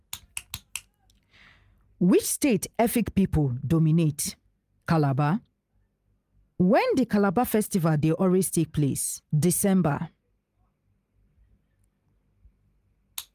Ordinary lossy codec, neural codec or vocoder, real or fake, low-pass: Opus, 32 kbps; none; real; 14.4 kHz